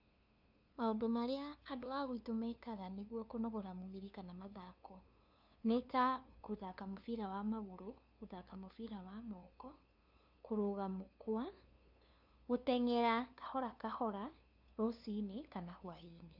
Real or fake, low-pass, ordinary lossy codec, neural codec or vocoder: fake; 5.4 kHz; none; codec, 16 kHz, 2 kbps, FunCodec, trained on LibriTTS, 25 frames a second